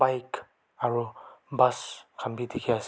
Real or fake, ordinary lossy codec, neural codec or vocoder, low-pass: real; none; none; none